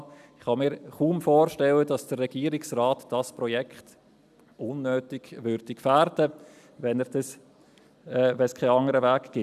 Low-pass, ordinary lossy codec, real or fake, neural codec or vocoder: 14.4 kHz; none; real; none